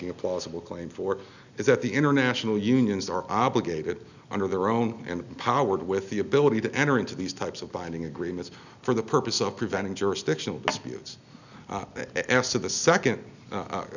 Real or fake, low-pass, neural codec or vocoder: real; 7.2 kHz; none